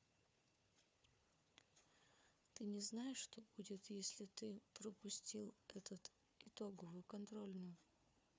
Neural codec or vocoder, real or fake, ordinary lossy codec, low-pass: codec, 16 kHz, 4 kbps, FunCodec, trained on Chinese and English, 50 frames a second; fake; none; none